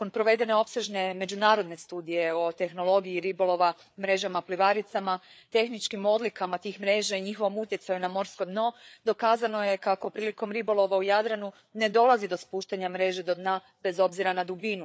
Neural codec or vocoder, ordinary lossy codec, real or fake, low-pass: codec, 16 kHz, 4 kbps, FreqCodec, larger model; none; fake; none